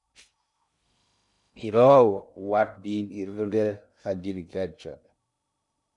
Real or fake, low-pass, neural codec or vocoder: fake; 10.8 kHz; codec, 16 kHz in and 24 kHz out, 0.6 kbps, FocalCodec, streaming, 2048 codes